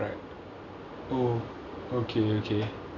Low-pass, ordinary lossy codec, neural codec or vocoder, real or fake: 7.2 kHz; none; none; real